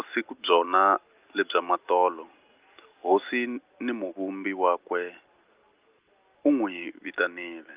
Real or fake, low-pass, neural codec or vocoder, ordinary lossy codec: real; 3.6 kHz; none; Opus, 32 kbps